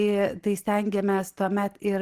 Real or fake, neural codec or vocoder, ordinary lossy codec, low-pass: real; none; Opus, 16 kbps; 14.4 kHz